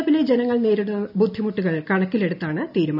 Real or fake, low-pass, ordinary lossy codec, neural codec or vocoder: real; 5.4 kHz; none; none